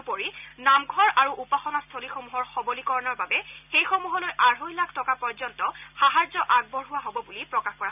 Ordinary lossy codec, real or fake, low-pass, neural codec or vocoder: none; real; 3.6 kHz; none